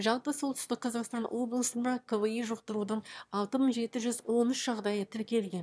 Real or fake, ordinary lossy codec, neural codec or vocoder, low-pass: fake; none; autoencoder, 22.05 kHz, a latent of 192 numbers a frame, VITS, trained on one speaker; none